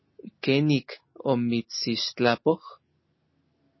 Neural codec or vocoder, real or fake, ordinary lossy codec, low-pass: none; real; MP3, 24 kbps; 7.2 kHz